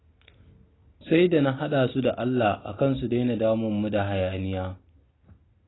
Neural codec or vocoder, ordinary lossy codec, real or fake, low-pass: none; AAC, 16 kbps; real; 7.2 kHz